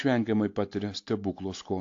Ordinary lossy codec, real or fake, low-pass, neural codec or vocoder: AAC, 48 kbps; real; 7.2 kHz; none